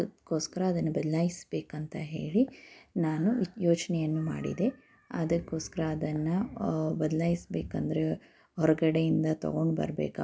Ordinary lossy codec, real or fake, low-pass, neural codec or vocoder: none; real; none; none